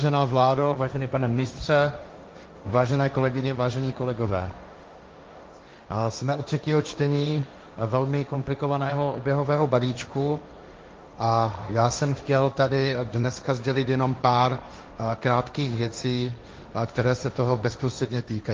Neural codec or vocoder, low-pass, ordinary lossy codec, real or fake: codec, 16 kHz, 1.1 kbps, Voila-Tokenizer; 7.2 kHz; Opus, 24 kbps; fake